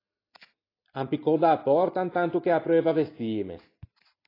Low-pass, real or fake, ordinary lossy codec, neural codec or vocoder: 5.4 kHz; real; AAC, 24 kbps; none